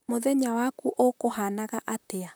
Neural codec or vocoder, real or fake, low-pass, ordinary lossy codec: vocoder, 44.1 kHz, 128 mel bands, Pupu-Vocoder; fake; none; none